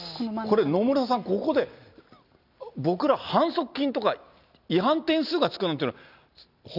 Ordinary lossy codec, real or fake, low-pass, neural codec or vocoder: none; real; 5.4 kHz; none